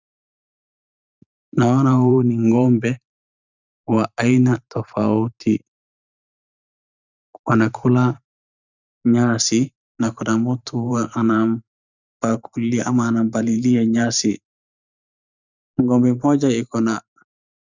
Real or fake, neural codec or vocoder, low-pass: fake; vocoder, 44.1 kHz, 128 mel bands every 512 samples, BigVGAN v2; 7.2 kHz